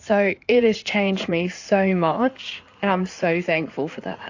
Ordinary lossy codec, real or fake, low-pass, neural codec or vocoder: AAC, 48 kbps; fake; 7.2 kHz; codec, 16 kHz in and 24 kHz out, 2.2 kbps, FireRedTTS-2 codec